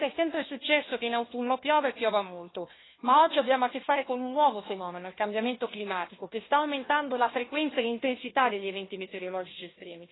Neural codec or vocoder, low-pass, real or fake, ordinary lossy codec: codec, 16 kHz, 1 kbps, FunCodec, trained on Chinese and English, 50 frames a second; 7.2 kHz; fake; AAC, 16 kbps